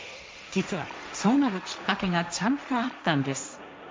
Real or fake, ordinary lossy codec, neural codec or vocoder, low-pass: fake; none; codec, 16 kHz, 1.1 kbps, Voila-Tokenizer; none